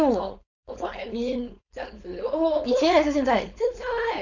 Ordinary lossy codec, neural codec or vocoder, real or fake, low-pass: none; codec, 16 kHz, 4.8 kbps, FACodec; fake; 7.2 kHz